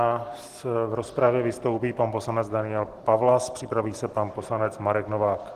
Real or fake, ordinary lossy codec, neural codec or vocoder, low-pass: real; Opus, 16 kbps; none; 14.4 kHz